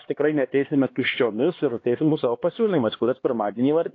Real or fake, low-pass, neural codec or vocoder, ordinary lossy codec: fake; 7.2 kHz; codec, 16 kHz, 2 kbps, X-Codec, HuBERT features, trained on LibriSpeech; AAC, 32 kbps